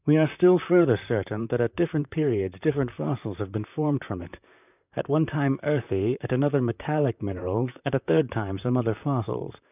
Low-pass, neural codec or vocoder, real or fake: 3.6 kHz; vocoder, 44.1 kHz, 128 mel bands, Pupu-Vocoder; fake